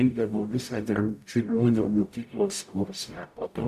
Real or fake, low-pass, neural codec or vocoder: fake; 14.4 kHz; codec, 44.1 kHz, 0.9 kbps, DAC